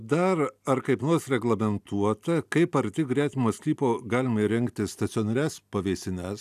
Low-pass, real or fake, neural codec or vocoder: 14.4 kHz; real; none